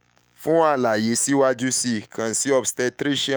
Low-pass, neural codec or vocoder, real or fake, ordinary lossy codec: none; autoencoder, 48 kHz, 128 numbers a frame, DAC-VAE, trained on Japanese speech; fake; none